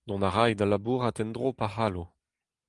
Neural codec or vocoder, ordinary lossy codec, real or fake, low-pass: none; Opus, 32 kbps; real; 10.8 kHz